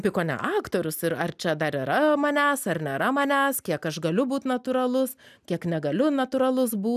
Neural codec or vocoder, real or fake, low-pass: none; real; 14.4 kHz